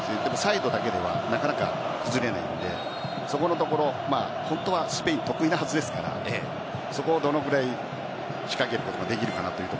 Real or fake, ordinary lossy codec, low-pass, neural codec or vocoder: real; none; none; none